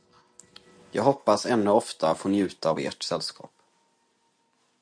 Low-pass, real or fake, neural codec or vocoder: 9.9 kHz; real; none